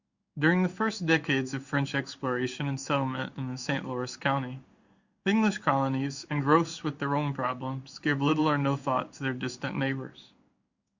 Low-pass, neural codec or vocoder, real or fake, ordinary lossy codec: 7.2 kHz; codec, 16 kHz in and 24 kHz out, 1 kbps, XY-Tokenizer; fake; Opus, 64 kbps